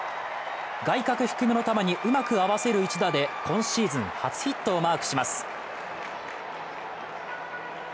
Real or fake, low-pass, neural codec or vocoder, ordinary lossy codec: real; none; none; none